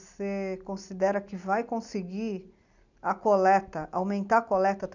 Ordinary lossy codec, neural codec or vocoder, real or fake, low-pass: none; none; real; 7.2 kHz